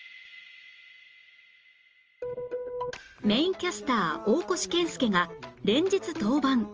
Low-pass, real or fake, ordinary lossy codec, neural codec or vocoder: 7.2 kHz; real; Opus, 24 kbps; none